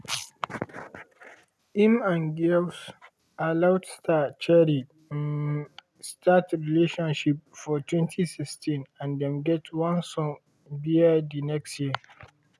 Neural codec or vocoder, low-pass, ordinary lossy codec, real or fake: none; none; none; real